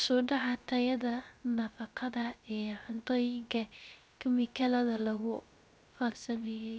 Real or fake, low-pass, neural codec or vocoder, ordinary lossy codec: fake; none; codec, 16 kHz, 0.3 kbps, FocalCodec; none